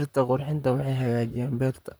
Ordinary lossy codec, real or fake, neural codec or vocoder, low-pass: none; fake; vocoder, 44.1 kHz, 128 mel bands, Pupu-Vocoder; none